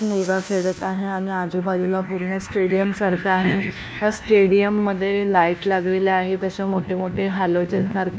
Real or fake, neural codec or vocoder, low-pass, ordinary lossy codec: fake; codec, 16 kHz, 1 kbps, FunCodec, trained on LibriTTS, 50 frames a second; none; none